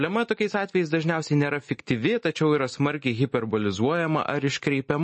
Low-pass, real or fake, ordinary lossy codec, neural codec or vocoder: 10.8 kHz; real; MP3, 32 kbps; none